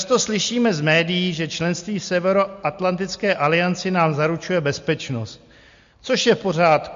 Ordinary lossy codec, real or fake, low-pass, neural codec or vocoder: MP3, 48 kbps; real; 7.2 kHz; none